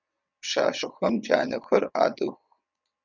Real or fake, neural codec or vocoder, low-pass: fake; vocoder, 22.05 kHz, 80 mel bands, WaveNeXt; 7.2 kHz